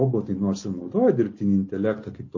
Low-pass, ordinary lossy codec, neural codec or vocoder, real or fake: 7.2 kHz; MP3, 32 kbps; none; real